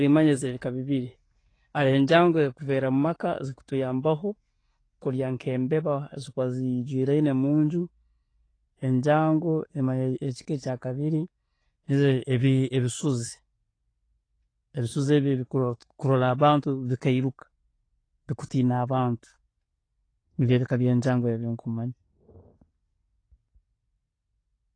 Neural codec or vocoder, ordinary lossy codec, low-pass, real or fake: none; AAC, 32 kbps; 9.9 kHz; real